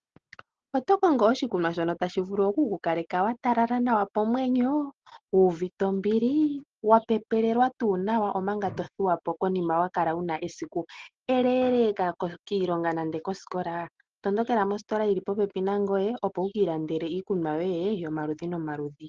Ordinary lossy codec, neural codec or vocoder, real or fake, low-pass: Opus, 16 kbps; none; real; 7.2 kHz